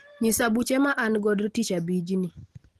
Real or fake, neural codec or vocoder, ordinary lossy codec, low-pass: real; none; Opus, 16 kbps; 14.4 kHz